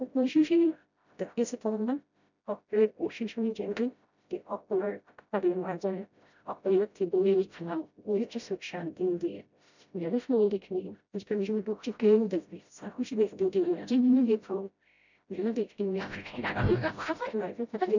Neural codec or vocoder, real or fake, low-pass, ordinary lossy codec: codec, 16 kHz, 0.5 kbps, FreqCodec, smaller model; fake; 7.2 kHz; none